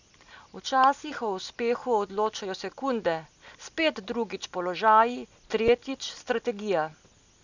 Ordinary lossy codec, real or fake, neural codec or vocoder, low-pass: none; real; none; 7.2 kHz